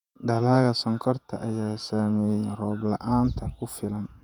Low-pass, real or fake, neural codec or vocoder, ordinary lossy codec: 19.8 kHz; fake; vocoder, 48 kHz, 128 mel bands, Vocos; none